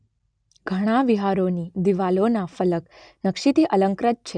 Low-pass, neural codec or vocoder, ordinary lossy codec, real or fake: 9.9 kHz; none; none; real